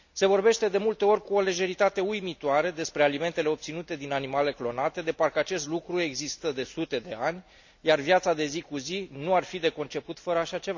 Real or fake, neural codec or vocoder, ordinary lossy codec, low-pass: real; none; none; 7.2 kHz